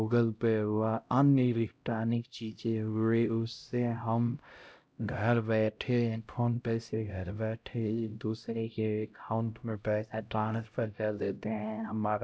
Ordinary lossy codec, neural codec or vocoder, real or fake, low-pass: none; codec, 16 kHz, 0.5 kbps, X-Codec, HuBERT features, trained on LibriSpeech; fake; none